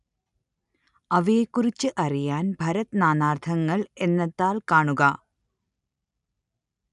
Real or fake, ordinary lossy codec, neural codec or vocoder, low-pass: real; none; none; 10.8 kHz